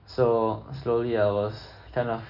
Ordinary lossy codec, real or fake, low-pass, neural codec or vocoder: none; real; 5.4 kHz; none